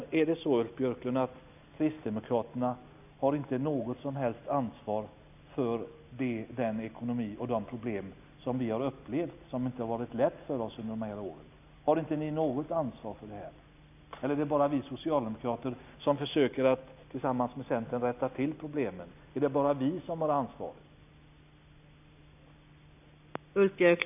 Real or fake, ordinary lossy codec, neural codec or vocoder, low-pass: real; none; none; 3.6 kHz